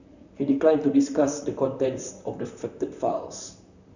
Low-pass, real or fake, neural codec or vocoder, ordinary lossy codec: 7.2 kHz; fake; vocoder, 44.1 kHz, 128 mel bands, Pupu-Vocoder; none